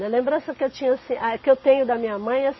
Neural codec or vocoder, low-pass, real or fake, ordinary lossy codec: none; 7.2 kHz; real; MP3, 24 kbps